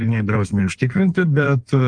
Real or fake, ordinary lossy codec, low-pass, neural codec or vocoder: fake; Opus, 32 kbps; 9.9 kHz; codec, 16 kHz in and 24 kHz out, 1.1 kbps, FireRedTTS-2 codec